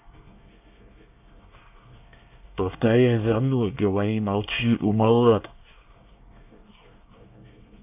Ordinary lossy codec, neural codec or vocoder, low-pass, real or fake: none; codec, 24 kHz, 1 kbps, SNAC; 3.6 kHz; fake